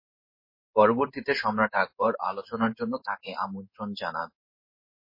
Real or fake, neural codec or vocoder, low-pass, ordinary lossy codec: fake; codec, 16 kHz in and 24 kHz out, 1 kbps, XY-Tokenizer; 5.4 kHz; MP3, 24 kbps